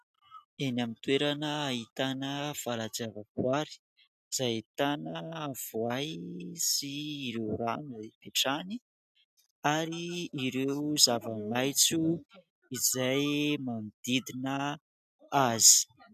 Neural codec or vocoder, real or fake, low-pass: none; real; 14.4 kHz